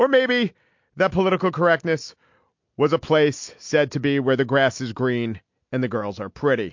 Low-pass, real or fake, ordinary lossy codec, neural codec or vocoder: 7.2 kHz; real; MP3, 48 kbps; none